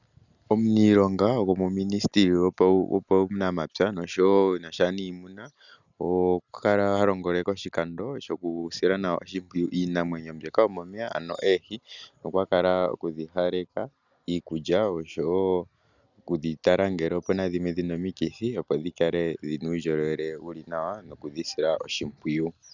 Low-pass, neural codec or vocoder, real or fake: 7.2 kHz; none; real